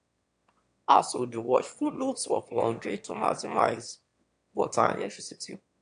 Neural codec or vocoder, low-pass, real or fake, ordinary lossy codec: autoencoder, 22.05 kHz, a latent of 192 numbers a frame, VITS, trained on one speaker; 9.9 kHz; fake; AAC, 64 kbps